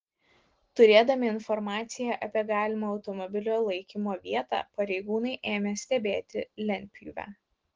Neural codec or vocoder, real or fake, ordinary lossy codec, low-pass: none; real; Opus, 16 kbps; 7.2 kHz